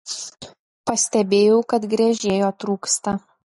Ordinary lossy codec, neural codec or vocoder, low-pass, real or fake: MP3, 48 kbps; none; 19.8 kHz; real